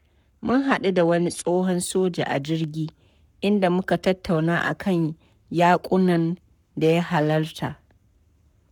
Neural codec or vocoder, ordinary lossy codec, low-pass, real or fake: codec, 44.1 kHz, 7.8 kbps, Pupu-Codec; none; 19.8 kHz; fake